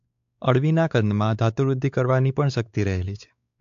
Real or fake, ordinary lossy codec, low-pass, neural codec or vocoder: fake; AAC, 64 kbps; 7.2 kHz; codec, 16 kHz, 4 kbps, X-Codec, WavLM features, trained on Multilingual LibriSpeech